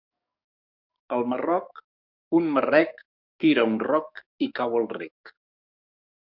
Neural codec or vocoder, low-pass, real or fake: codec, 44.1 kHz, 7.8 kbps, DAC; 5.4 kHz; fake